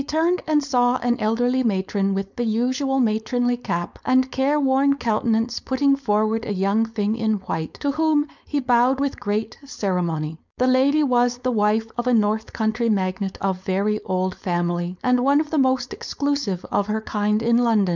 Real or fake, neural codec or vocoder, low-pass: fake; codec, 16 kHz, 4.8 kbps, FACodec; 7.2 kHz